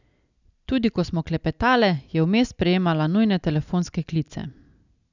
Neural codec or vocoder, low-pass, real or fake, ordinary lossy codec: none; 7.2 kHz; real; none